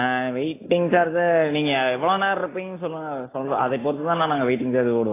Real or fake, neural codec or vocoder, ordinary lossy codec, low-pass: real; none; AAC, 16 kbps; 3.6 kHz